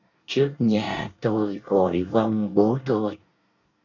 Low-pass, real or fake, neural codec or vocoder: 7.2 kHz; fake; codec, 24 kHz, 1 kbps, SNAC